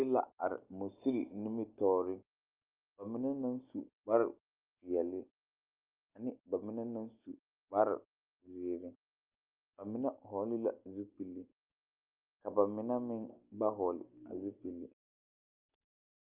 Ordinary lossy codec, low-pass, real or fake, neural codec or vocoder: Opus, 24 kbps; 3.6 kHz; real; none